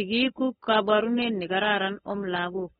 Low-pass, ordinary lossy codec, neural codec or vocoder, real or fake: 7.2 kHz; AAC, 16 kbps; codec, 16 kHz, 8 kbps, FunCodec, trained on LibriTTS, 25 frames a second; fake